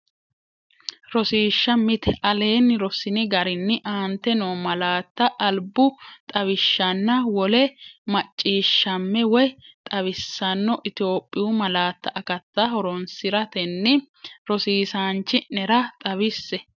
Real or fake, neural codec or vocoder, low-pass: real; none; 7.2 kHz